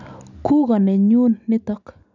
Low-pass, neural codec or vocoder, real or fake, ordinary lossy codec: 7.2 kHz; none; real; none